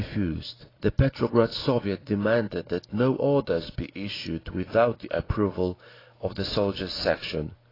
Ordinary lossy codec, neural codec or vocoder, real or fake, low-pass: AAC, 24 kbps; none; real; 5.4 kHz